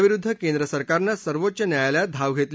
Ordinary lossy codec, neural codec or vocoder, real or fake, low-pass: none; none; real; none